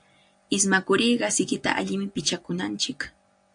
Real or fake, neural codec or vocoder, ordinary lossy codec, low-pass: real; none; AAC, 64 kbps; 9.9 kHz